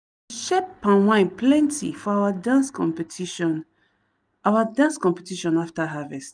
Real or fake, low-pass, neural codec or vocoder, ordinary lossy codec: real; 9.9 kHz; none; none